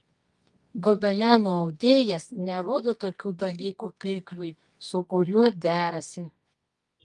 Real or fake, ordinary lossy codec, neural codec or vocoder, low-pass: fake; Opus, 32 kbps; codec, 24 kHz, 0.9 kbps, WavTokenizer, medium music audio release; 10.8 kHz